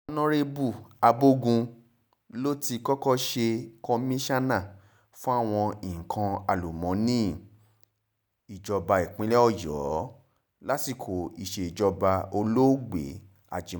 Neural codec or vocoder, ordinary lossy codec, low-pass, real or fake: none; none; none; real